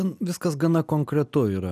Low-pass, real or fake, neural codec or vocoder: 14.4 kHz; real; none